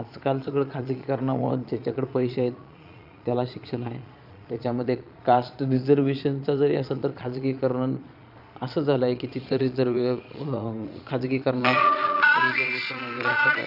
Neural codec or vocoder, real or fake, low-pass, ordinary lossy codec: vocoder, 22.05 kHz, 80 mel bands, Vocos; fake; 5.4 kHz; none